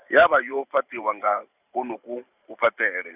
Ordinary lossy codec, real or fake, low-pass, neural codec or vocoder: none; fake; 3.6 kHz; vocoder, 44.1 kHz, 128 mel bands every 512 samples, BigVGAN v2